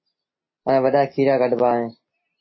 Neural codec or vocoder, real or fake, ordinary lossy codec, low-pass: none; real; MP3, 24 kbps; 7.2 kHz